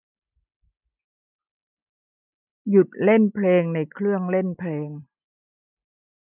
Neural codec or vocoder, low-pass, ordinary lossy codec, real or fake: none; 3.6 kHz; none; real